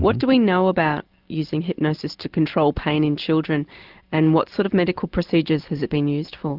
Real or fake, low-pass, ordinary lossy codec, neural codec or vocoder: real; 5.4 kHz; Opus, 32 kbps; none